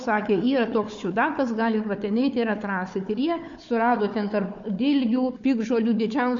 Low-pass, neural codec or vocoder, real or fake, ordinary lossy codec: 7.2 kHz; codec, 16 kHz, 4 kbps, FunCodec, trained on Chinese and English, 50 frames a second; fake; MP3, 48 kbps